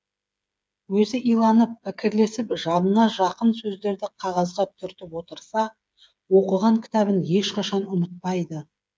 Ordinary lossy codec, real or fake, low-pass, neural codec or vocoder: none; fake; none; codec, 16 kHz, 8 kbps, FreqCodec, smaller model